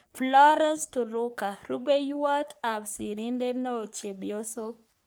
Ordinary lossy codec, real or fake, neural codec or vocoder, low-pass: none; fake; codec, 44.1 kHz, 3.4 kbps, Pupu-Codec; none